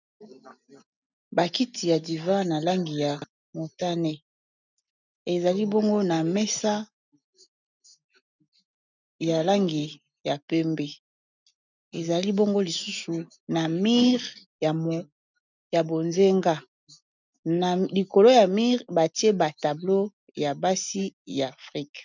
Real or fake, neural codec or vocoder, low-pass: real; none; 7.2 kHz